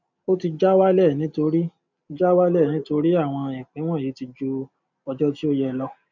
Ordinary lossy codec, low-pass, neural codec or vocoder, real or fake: none; 7.2 kHz; none; real